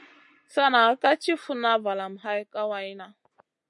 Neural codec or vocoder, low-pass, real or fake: none; 10.8 kHz; real